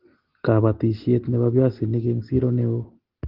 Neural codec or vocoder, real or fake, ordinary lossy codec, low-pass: none; real; Opus, 16 kbps; 5.4 kHz